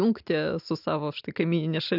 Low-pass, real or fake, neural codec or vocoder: 5.4 kHz; real; none